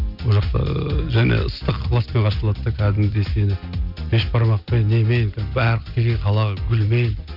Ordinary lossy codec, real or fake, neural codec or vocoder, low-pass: none; real; none; 5.4 kHz